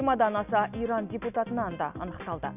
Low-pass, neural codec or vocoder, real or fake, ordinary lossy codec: 3.6 kHz; none; real; none